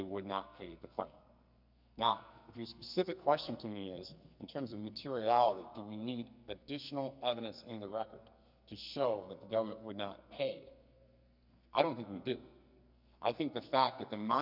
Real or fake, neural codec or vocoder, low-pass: fake; codec, 44.1 kHz, 2.6 kbps, SNAC; 5.4 kHz